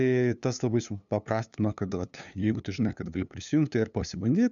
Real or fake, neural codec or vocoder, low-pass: fake; codec, 16 kHz, 2 kbps, FunCodec, trained on LibriTTS, 25 frames a second; 7.2 kHz